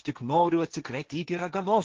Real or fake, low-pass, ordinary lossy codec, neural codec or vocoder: fake; 7.2 kHz; Opus, 16 kbps; codec, 16 kHz, 1.1 kbps, Voila-Tokenizer